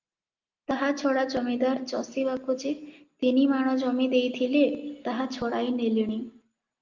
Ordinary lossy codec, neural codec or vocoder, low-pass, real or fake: Opus, 24 kbps; none; 7.2 kHz; real